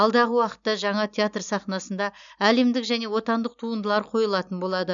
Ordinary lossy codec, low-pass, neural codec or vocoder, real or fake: none; 7.2 kHz; none; real